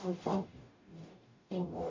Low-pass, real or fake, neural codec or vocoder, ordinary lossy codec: 7.2 kHz; fake; codec, 44.1 kHz, 0.9 kbps, DAC; MP3, 48 kbps